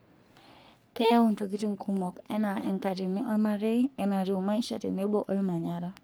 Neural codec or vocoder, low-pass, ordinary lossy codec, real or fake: codec, 44.1 kHz, 3.4 kbps, Pupu-Codec; none; none; fake